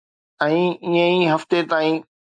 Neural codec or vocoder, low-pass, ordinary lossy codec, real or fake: none; 9.9 kHz; MP3, 96 kbps; real